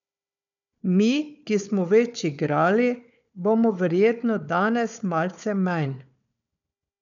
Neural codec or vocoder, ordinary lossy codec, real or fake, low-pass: codec, 16 kHz, 4 kbps, FunCodec, trained on Chinese and English, 50 frames a second; none; fake; 7.2 kHz